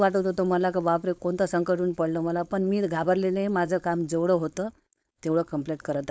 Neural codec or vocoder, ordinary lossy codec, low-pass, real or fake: codec, 16 kHz, 4.8 kbps, FACodec; none; none; fake